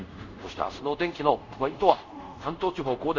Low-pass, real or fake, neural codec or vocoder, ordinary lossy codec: 7.2 kHz; fake; codec, 24 kHz, 0.5 kbps, DualCodec; none